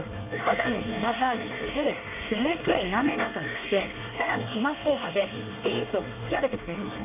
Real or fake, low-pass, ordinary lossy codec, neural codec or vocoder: fake; 3.6 kHz; none; codec, 24 kHz, 1 kbps, SNAC